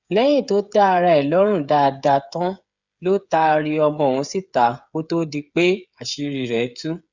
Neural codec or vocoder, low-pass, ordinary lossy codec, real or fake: codec, 16 kHz, 8 kbps, FreqCodec, smaller model; 7.2 kHz; Opus, 64 kbps; fake